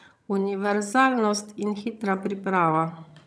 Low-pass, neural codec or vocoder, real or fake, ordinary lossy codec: none; vocoder, 22.05 kHz, 80 mel bands, HiFi-GAN; fake; none